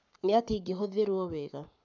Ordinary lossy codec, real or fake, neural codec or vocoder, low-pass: none; fake; codec, 44.1 kHz, 7.8 kbps, Pupu-Codec; 7.2 kHz